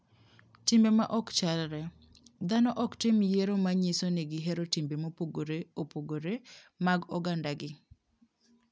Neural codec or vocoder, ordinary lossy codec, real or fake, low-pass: none; none; real; none